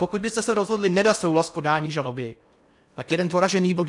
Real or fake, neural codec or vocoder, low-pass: fake; codec, 16 kHz in and 24 kHz out, 0.8 kbps, FocalCodec, streaming, 65536 codes; 10.8 kHz